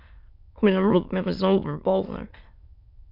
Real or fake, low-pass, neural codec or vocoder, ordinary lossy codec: fake; 5.4 kHz; autoencoder, 22.05 kHz, a latent of 192 numbers a frame, VITS, trained on many speakers; MP3, 48 kbps